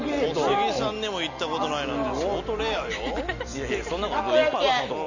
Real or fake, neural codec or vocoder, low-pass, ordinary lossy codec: real; none; 7.2 kHz; none